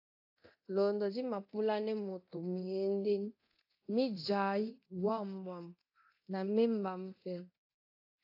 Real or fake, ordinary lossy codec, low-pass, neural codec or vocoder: fake; AAC, 32 kbps; 5.4 kHz; codec, 24 kHz, 0.9 kbps, DualCodec